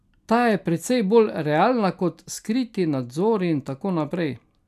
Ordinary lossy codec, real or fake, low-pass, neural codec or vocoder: none; real; 14.4 kHz; none